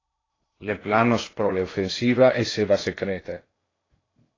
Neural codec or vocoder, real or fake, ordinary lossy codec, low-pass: codec, 16 kHz in and 24 kHz out, 0.8 kbps, FocalCodec, streaming, 65536 codes; fake; AAC, 32 kbps; 7.2 kHz